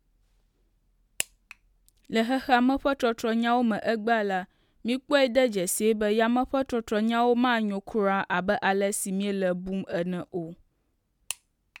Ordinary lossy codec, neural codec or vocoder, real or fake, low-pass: MP3, 96 kbps; none; real; 19.8 kHz